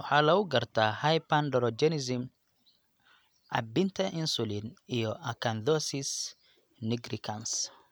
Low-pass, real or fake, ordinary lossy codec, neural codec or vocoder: none; real; none; none